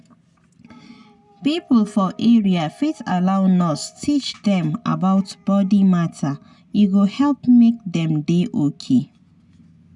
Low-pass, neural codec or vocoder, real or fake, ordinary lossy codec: 10.8 kHz; none; real; none